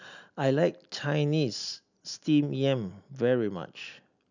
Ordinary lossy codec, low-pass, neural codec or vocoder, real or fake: none; 7.2 kHz; none; real